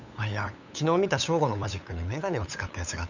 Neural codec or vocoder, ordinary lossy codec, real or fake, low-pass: codec, 16 kHz, 8 kbps, FunCodec, trained on LibriTTS, 25 frames a second; none; fake; 7.2 kHz